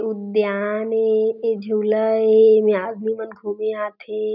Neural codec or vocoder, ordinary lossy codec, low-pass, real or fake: none; none; 5.4 kHz; real